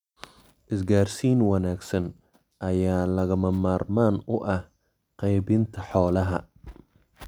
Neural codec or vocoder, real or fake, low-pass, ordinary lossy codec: none; real; 19.8 kHz; none